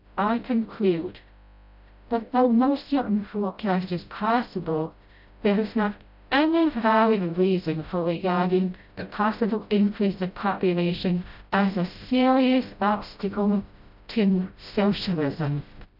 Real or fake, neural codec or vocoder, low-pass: fake; codec, 16 kHz, 0.5 kbps, FreqCodec, smaller model; 5.4 kHz